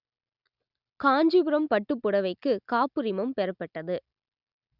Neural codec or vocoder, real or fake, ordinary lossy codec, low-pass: none; real; Opus, 64 kbps; 5.4 kHz